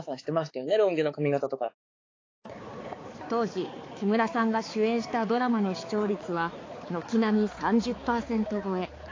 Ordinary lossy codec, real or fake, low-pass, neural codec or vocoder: AAC, 32 kbps; fake; 7.2 kHz; codec, 16 kHz, 4 kbps, X-Codec, HuBERT features, trained on balanced general audio